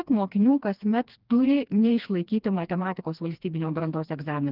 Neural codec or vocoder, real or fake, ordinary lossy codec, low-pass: codec, 16 kHz, 2 kbps, FreqCodec, smaller model; fake; Opus, 24 kbps; 5.4 kHz